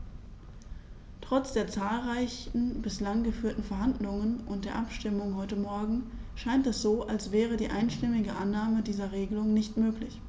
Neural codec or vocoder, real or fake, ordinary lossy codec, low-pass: none; real; none; none